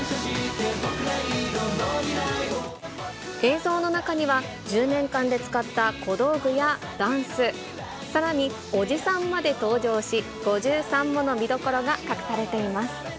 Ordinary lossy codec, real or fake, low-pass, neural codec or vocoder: none; real; none; none